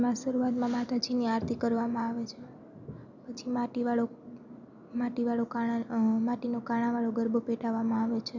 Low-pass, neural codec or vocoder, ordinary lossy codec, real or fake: 7.2 kHz; none; none; real